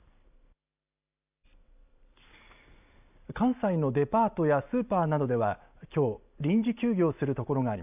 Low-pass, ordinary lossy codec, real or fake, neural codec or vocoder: 3.6 kHz; AAC, 32 kbps; real; none